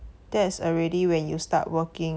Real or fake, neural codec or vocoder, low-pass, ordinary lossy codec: real; none; none; none